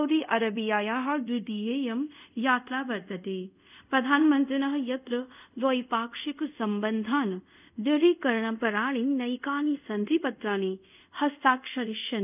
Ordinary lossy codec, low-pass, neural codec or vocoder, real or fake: none; 3.6 kHz; codec, 24 kHz, 0.5 kbps, DualCodec; fake